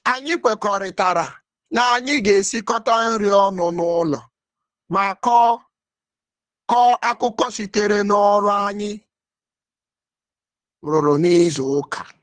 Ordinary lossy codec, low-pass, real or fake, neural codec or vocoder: Opus, 24 kbps; 9.9 kHz; fake; codec, 24 kHz, 3 kbps, HILCodec